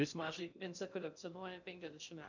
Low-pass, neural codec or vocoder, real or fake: 7.2 kHz; codec, 16 kHz in and 24 kHz out, 0.6 kbps, FocalCodec, streaming, 2048 codes; fake